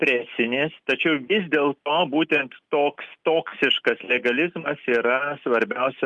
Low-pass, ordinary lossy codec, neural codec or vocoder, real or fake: 10.8 kHz; Opus, 32 kbps; none; real